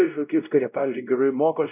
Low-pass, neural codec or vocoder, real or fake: 3.6 kHz; codec, 16 kHz, 0.5 kbps, X-Codec, WavLM features, trained on Multilingual LibriSpeech; fake